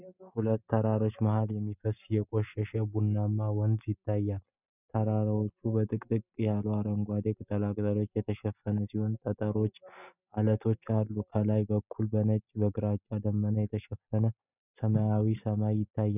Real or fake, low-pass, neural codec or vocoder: real; 3.6 kHz; none